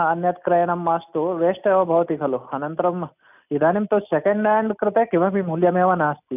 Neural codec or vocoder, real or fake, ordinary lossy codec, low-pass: none; real; none; 3.6 kHz